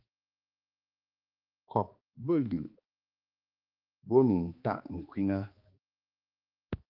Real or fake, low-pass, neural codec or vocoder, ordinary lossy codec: fake; 5.4 kHz; codec, 16 kHz, 2 kbps, X-Codec, HuBERT features, trained on balanced general audio; Opus, 32 kbps